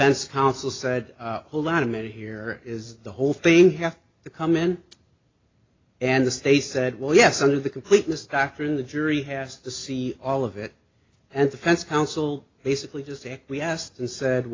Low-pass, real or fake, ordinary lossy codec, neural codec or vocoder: 7.2 kHz; real; AAC, 32 kbps; none